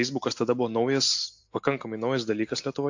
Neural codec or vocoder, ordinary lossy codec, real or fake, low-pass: none; AAC, 48 kbps; real; 7.2 kHz